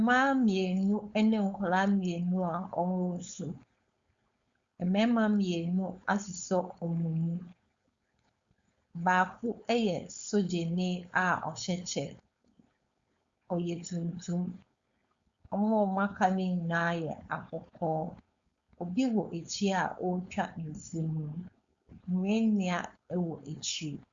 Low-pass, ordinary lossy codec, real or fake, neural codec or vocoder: 7.2 kHz; Opus, 64 kbps; fake; codec, 16 kHz, 4.8 kbps, FACodec